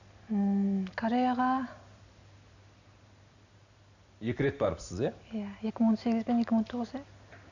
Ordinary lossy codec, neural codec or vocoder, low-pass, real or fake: none; none; 7.2 kHz; real